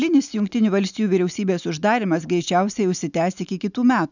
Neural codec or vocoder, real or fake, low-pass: none; real; 7.2 kHz